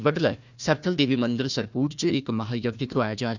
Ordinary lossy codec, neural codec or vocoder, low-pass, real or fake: none; codec, 16 kHz, 1 kbps, FunCodec, trained on Chinese and English, 50 frames a second; 7.2 kHz; fake